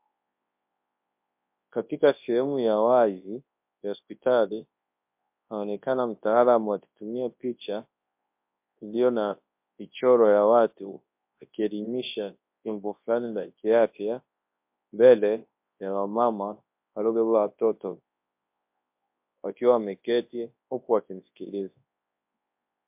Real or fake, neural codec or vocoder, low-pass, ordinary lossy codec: fake; codec, 24 kHz, 0.9 kbps, WavTokenizer, large speech release; 3.6 kHz; MP3, 32 kbps